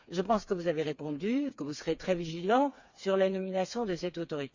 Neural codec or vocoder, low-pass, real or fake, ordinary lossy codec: codec, 16 kHz, 4 kbps, FreqCodec, smaller model; 7.2 kHz; fake; Opus, 64 kbps